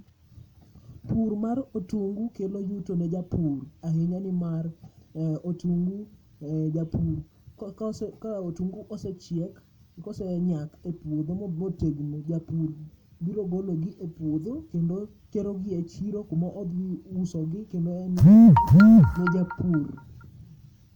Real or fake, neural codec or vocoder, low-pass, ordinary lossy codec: real; none; 19.8 kHz; none